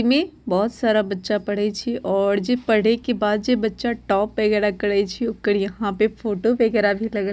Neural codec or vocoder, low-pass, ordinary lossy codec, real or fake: none; none; none; real